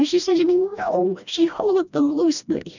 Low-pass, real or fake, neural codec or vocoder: 7.2 kHz; fake; codec, 16 kHz, 1 kbps, FreqCodec, smaller model